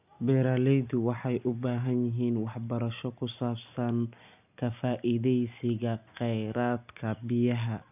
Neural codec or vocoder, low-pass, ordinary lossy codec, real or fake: none; 3.6 kHz; none; real